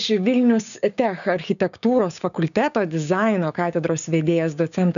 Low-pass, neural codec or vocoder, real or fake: 7.2 kHz; codec, 16 kHz, 6 kbps, DAC; fake